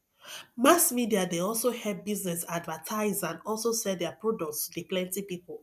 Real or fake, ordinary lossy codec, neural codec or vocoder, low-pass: real; none; none; 14.4 kHz